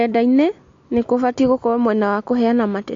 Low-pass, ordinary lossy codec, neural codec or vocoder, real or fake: 7.2 kHz; AAC, 48 kbps; none; real